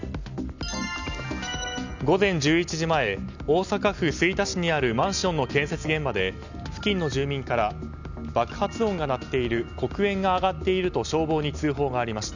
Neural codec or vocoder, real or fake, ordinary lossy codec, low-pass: none; real; none; 7.2 kHz